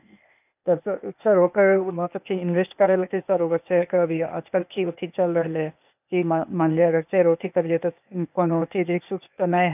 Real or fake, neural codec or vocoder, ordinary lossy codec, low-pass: fake; codec, 16 kHz, 0.8 kbps, ZipCodec; none; 3.6 kHz